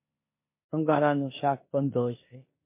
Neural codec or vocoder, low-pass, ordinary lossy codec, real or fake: codec, 16 kHz in and 24 kHz out, 0.9 kbps, LongCat-Audio-Codec, four codebook decoder; 3.6 kHz; MP3, 24 kbps; fake